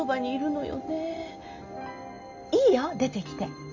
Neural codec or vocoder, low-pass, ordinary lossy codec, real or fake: none; 7.2 kHz; MP3, 64 kbps; real